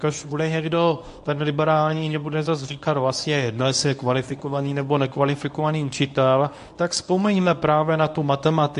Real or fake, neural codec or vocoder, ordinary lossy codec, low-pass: fake; codec, 24 kHz, 0.9 kbps, WavTokenizer, medium speech release version 1; AAC, 64 kbps; 10.8 kHz